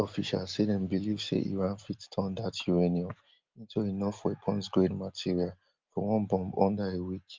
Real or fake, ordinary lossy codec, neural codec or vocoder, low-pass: real; Opus, 32 kbps; none; 7.2 kHz